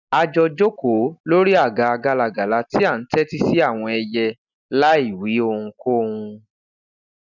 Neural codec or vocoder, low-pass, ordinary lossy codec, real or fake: none; 7.2 kHz; none; real